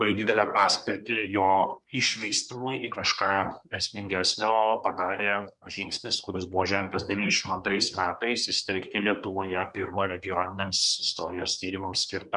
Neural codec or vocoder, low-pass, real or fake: codec, 24 kHz, 1 kbps, SNAC; 10.8 kHz; fake